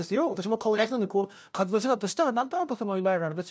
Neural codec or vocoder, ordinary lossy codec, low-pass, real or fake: codec, 16 kHz, 0.5 kbps, FunCodec, trained on LibriTTS, 25 frames a second; none; none; fake